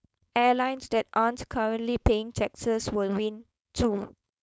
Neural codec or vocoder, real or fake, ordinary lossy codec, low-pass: codec, 16 kHz, 4.8 kbps, FACodec; fake; none; none